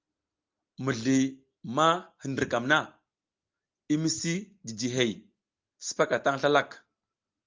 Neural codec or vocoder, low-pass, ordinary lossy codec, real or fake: none; 7.2 kHz; Opus, 24 kbps; real